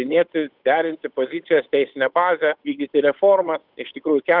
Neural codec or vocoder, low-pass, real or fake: codec, 16 kHz, 8 kbps, FunCodec, trained on Chinese and English, 25 frames a second; 5.4 kHz; fake